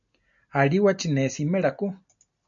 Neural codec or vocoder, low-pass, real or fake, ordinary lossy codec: none; 7.2 kHz; real; MP3, 96 kbps